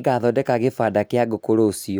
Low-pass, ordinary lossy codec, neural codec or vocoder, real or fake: none; none; none; real